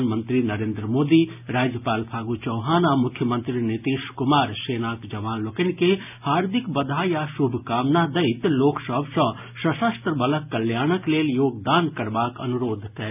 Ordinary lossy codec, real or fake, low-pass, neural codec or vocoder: none; real; 3.6 kHz; none